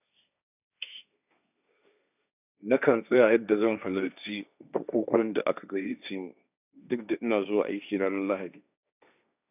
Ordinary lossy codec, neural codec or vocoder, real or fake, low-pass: none; codec, 16 kHz, 1.1 kbps, Voila-Tokenizer; fake; 3.6 kHz